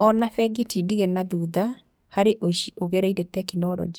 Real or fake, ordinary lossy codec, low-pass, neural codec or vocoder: fake; none; none; codec, 44.1 kHz, 2.6 kbps, SNAC